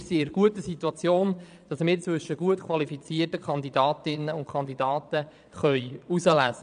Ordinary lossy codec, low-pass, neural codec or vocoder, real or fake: none; 9.9 kHz; vocoder, 22.05 kHz, 80 mel bands, Vocos; fake